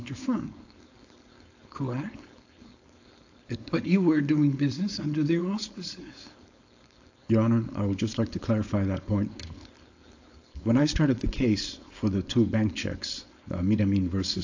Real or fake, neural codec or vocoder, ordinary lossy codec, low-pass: fake; codec, 16 kHz, 4.8 kbps, FACodec; AAC, 48 kbps; 7.2 kHz